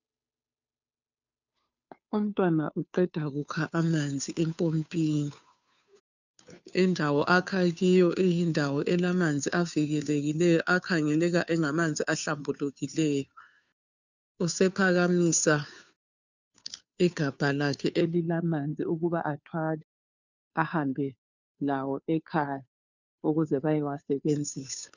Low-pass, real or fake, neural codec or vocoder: 7.2 kHz; fake; codec, 16 kHz, 2 kbps, FunCodec, trained on Chinese and English, 25 frames a second